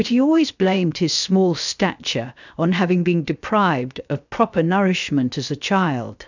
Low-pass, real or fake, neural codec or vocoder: 7.2 kHz; fake; codec, 16 kHz, 0.7 kbps, FocalCodec